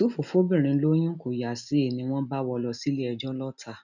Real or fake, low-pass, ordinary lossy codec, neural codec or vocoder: real; 7.2 kHz; none; none